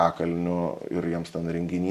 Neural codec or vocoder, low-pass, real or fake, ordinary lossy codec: none; 14.4 kHz; real; Opus, 64 kbps